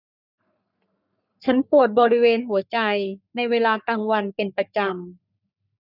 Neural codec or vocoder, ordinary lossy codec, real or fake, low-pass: codec, 44.1 kHz, 3.4 kbps, Pupu-Codec; none; fake; 5.4 kHz